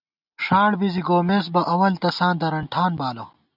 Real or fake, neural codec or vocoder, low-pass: real; none; 5.4 kHz